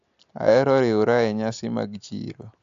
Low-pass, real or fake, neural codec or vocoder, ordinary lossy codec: 7.2 kHz; real; none; AAC, 64 kbps